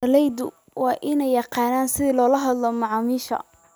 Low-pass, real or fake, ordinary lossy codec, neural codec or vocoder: none; real; none; none